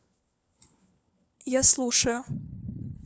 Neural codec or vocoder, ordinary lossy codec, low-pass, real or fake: codec, 16 kHz, 4 kbps, FunCodec, trained on LibriTTS, 50 frames a second; none; none; fake